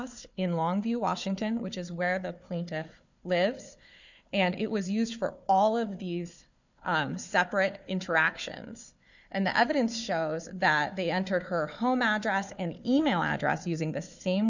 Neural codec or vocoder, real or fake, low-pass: codec, 16 kHz, 4 kbps, FunCodec, trained on Chinese and English, 50 frames a second; fake; 7.2 kHz